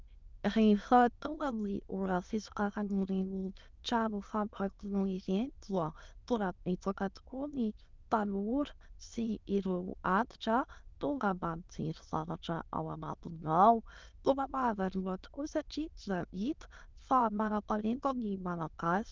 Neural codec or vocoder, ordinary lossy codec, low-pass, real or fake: autoencoder, 22.05 kHz, a latent of 192 numbers a frame, VITS, trained on many speakers; Opus, 24 kbps; 7.2 kHz; fake